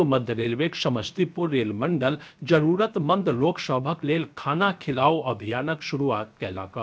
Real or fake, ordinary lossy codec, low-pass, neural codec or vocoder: fake; none; none; codec, 16 kHz, 0.7 kbps, FocalCodec